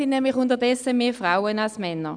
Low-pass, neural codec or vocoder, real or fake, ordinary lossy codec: 9.9 kHz; none; real; none